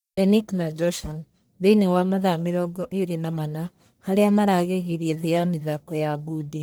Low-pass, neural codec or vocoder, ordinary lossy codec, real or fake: none; codec, 44.1 kHz, 1.7 kbps, Pupu-Codec; none; fake